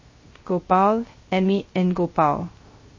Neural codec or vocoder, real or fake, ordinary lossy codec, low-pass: codec, 16 kHz, 0.3 kbps, FocalCodec; fake; MP3, 32 kbps; 7.2 kHz